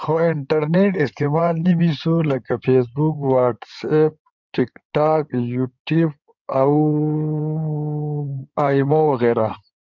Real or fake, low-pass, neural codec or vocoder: fake; 7.2 kHz; codec, 16 kHz in and 24 kHz out, 2.2 kbps, FireRedTTS-2 codec